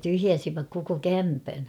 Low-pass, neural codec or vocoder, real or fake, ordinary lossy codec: 19.8 kHz; none; real; none